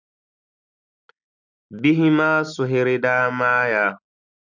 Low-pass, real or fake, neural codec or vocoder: 7.2 kHz; real; none